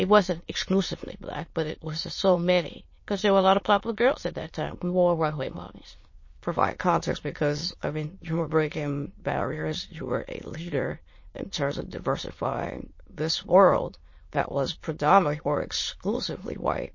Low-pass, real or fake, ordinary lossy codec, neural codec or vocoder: 7.2 kHz; fake; MP3, 32 kbps; autoencoder, 22.05 kHz, a latent of 192 numbers a frame, VITS, trained on many speakers